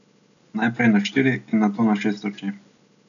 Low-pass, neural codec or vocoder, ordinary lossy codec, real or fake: 7.2 kHz; none; none; real